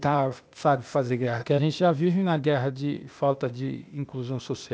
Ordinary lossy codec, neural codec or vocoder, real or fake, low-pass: none; codec, 16 kHz, 0.8 kbps, ZipCodec; fake; none